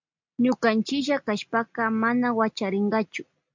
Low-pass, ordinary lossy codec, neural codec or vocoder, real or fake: 7.2 kHz; MP3, 64 kbps; none; real